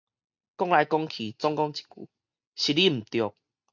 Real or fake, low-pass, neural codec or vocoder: real; 7.2 kHz; none